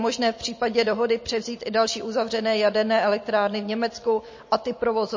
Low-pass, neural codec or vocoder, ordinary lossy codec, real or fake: 7.2 kHz; none; MP3, 32 kbps; real